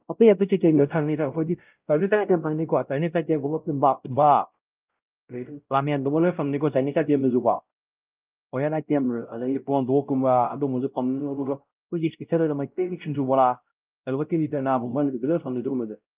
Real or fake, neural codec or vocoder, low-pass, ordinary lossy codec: fake; codec, 16 kHz, 0.5 kbps, X-Codec, WavLM features, trained on Multilingual LibriSpeech; 3.6 kHz; Opus, 24 kbps